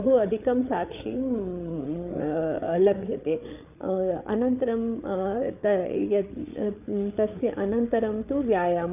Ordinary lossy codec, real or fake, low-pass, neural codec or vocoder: none; fake; 3.6 kHz; codec, 16 kHz, 8 kbps, FreqCodec, larger model